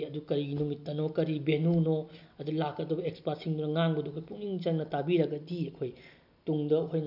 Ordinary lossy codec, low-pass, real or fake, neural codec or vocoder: none; 5.4 kHz; real; none